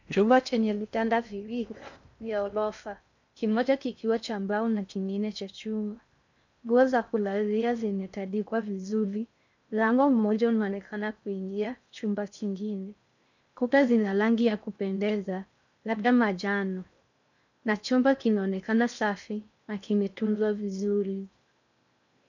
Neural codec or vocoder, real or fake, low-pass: codec, 16 kHz in and 24 kHz out, 0.6 kbps, FocalCodec, streaming, 2048 codes; fake; 7.2 kHz